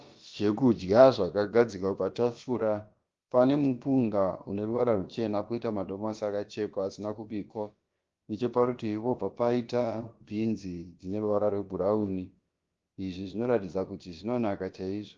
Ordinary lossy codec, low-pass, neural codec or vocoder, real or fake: Opus, 32 kbps; 7.2 kHz; codec, 16 kHz, about 1 kbps, DyCAST, with the encoder's durations; fake